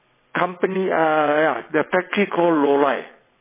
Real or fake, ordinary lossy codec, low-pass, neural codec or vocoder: fake; MP3, 16 kbps; 3.6 kHz; vocoder, 22.05 kHz, 80 mel bands, WaveNeXt